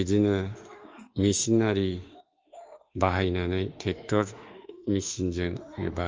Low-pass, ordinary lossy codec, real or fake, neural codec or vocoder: 7.2 kHz; Opus, 24 kbps; fake; autoencoder, 48 kHz, 32 numbers a frame, DAC-VAE, trained on Japanese speech